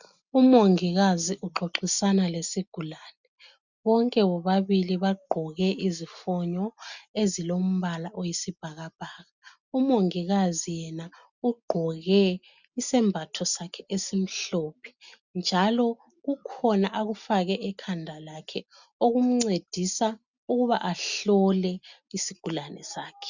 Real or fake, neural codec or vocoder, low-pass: real; none; 7.2 kHz